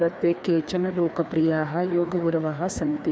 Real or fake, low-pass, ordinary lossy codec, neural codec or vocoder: fake; none; none; codec, 16 kHz, 2 kbps, FreqCodec, larger model